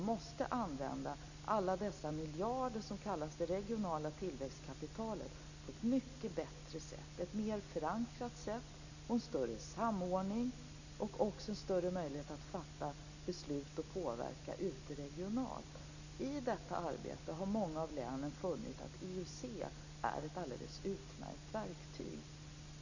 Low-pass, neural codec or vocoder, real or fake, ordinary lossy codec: 7.2 kHz; none; real; none